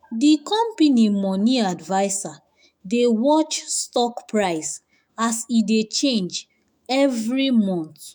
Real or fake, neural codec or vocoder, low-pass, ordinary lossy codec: fake; autoencoder, 48 kHz, 128 numbers a frame, DAC-VAE, trained on Japanese speech; 19.8 kHz; none